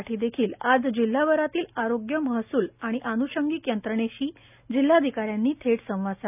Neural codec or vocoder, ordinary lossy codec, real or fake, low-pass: none; none; real; 3.6 kHz